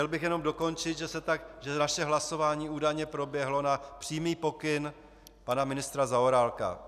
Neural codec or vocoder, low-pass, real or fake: none; 14.4 kHz; real